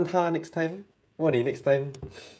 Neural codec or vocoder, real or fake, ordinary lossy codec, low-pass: codec, 16 kHz, 16 kbps, FreqCodec, smaller model; fake; none; none